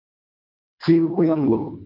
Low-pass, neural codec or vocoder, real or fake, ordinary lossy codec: 5.4 kHz; codec, 24 kHz, 1.5 kbps, HILCodec; fake; MP3, 32 kbps